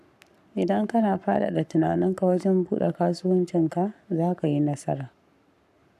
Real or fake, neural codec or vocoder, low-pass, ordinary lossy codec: fake; codec, 44.1 kHz, 7.8 kbps, Pupu-Codec; 14.4 kHz; none